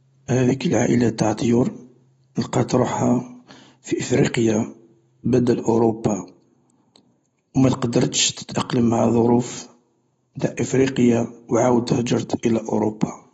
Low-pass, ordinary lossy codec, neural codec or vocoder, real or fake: 19.8 kHz; AAC, 24 kbps; none; real